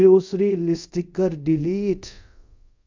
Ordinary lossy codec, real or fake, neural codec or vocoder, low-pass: MP3, 64 kbps; fake; codec, 24 kHz, 0.5 kbps, DualCodec; 7.2 kHz